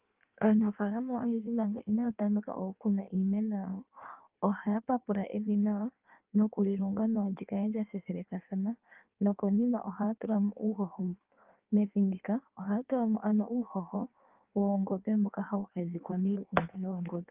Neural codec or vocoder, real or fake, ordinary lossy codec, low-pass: codec, 16 kHz in and 24 kHz out, 1.1 kbps, FireRedTTS-2 codec; fake; Opus, 32 kbps; 3.6 kHz